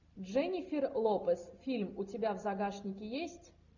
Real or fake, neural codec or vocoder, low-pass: real; none; 7.2 kHz